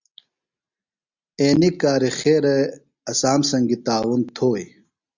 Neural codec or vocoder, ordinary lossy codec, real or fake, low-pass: none; Opus, 64 kbps; real; 7.2 kHz